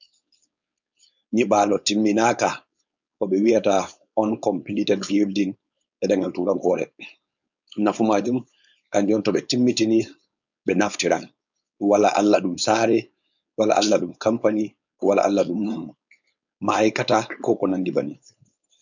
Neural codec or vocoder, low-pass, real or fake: codec, 16 kHz, 4.8 kbps, FACodec; 7.2 kHz; fake